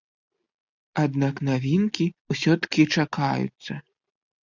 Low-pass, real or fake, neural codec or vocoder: 7.2 kHz; real; none